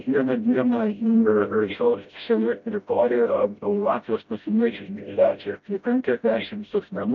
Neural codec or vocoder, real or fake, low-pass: codec, 16 kHz, 0.5 kbps, FreqCodec, smaller model; fake; 7.2 kHz